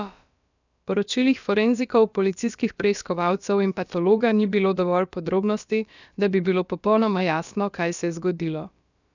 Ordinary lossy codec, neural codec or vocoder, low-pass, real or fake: none; codec, 16 kHz, about 1 kbps, DyCAST, with the encoder's durations; 7.2 kHz; fake